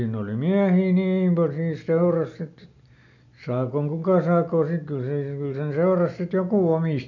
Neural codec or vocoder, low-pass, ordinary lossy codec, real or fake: none; 7.2 kHz; none; real